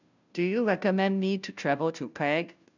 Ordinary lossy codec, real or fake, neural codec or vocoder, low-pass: none; fake; codec, 16 kHz, 0.5 kbps, FunCodec, trained on Chinese and English, 25 frames a second; 7.2 kHz